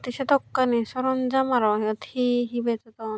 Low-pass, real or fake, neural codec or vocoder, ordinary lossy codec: none; real; none; none